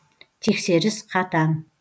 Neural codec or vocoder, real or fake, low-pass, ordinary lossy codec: none; real; none; none